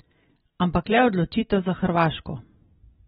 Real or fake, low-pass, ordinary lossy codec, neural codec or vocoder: real; 19.8 kHz; AAC, 16 kbps; none